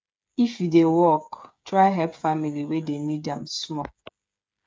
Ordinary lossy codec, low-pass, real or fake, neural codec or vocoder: none; none; fake; codec, 16 kHz, 8 kbps, FreqCodec, smaller model